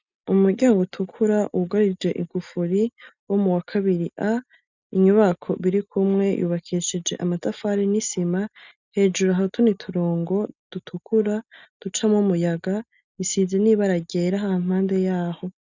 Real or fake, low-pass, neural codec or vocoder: real; 7.2 kHz; none